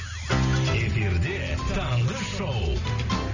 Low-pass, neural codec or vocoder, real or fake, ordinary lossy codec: 7.2 kHz; none; real; none